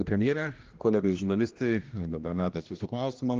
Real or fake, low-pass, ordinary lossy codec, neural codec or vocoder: fake; 7.2 kHz; Opus, 32 kbps; codec, 16 kHz, 1 kbps, X-Codec, HuBERT features, trained on general audio